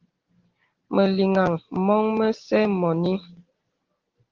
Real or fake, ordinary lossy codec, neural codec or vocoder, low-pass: real; Opus, 16 kbps; none; 7.2 kHz